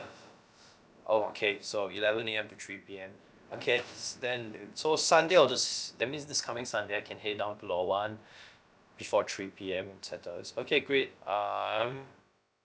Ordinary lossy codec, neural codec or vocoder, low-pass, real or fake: none; codec, 16 kHz, about 1 kbps, DyCAST, with the encoder's durations; none; fake